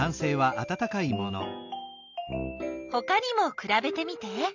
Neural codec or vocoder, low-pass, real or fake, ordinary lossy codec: none; 7.2 kHz; real; MP3, 64 kbps